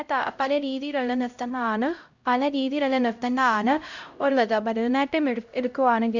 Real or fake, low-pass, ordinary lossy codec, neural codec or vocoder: fake; 7.2 kHz; Opus, 64 kbps; codec, 16 kHz, 0.5 kbps, X-Codec, HuBERT features, trained on LibriSpeech